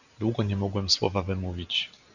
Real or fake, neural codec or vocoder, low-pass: real; none; 7.2 kHz